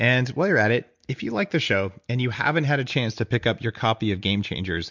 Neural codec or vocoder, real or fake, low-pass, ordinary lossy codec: none; real; 7.2 kHz; MP3, 64 kbps